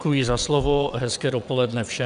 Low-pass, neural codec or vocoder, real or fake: 9.9 kHz; vocoder, 22.05 kHz, 80 mel bands, WaveNeXt; fake